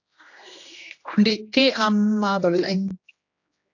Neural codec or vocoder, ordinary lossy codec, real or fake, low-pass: codec, 16 kHz, 1 kbps, X-Codec, HuBERT features, trained on general audio; AAC, 48 kbps; fake; 7.2 kHz